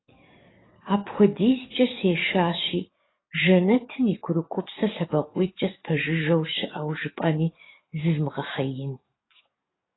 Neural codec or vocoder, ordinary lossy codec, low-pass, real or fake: codec, 44.1 kHz, 7.8 kbps, DAC; AAC, 16 kbps; 7.2 kHz; fake